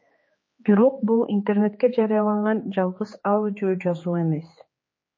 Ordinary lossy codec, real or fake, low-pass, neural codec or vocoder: MP3, 32 kbps; fake; 7.2 kHz; codec, 16 kHz, 4 kbps, X-Codec, HuBERT features, trained on general audio